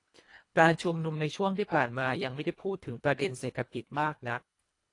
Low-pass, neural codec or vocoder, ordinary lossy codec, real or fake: 10.8 kHz; codec, 24 kHz, 1.5 kbps, HILCodec; AAC, 48 kbps; fake